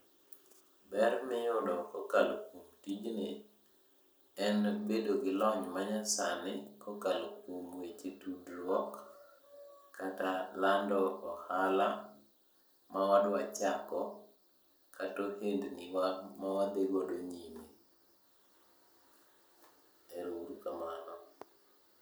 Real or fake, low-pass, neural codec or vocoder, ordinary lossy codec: real; none; none; none